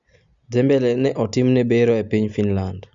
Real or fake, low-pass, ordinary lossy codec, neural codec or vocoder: real; 7.2 kHz; Opus, 64 kbps; none